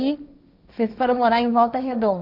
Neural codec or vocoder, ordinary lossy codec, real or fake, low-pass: codec, 16 kHz, 1.1 kbps, Voila-Tokenizer; none; fake; 5.4 kHz